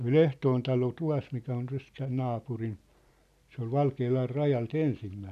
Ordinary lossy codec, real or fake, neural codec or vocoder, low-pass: none; real; none; 14.4 kHz